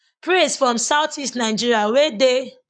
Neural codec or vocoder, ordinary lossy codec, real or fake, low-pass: codec, 44.1 kHz, 7.8 kbps, Pupu-Codec; none; fake; 9.9 kHz